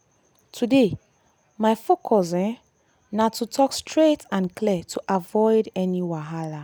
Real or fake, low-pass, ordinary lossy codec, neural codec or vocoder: real; none; none; none